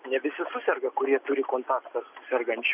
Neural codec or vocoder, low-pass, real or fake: none; 3.6 kHz; real